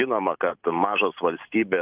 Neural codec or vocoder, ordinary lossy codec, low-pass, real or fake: vocoder, 44.1 kHz, 128 mel bands every 512 samples, BigVGAN v2; Opus, 32 kbps; 3.6 kHz; fake